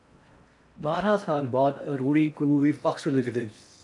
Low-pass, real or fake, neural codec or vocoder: 10.8 kHz; fake; codec, 16 kHz in and 24 kHz out, 0.6 kbps, FocalCodec, streaming, 4096 codes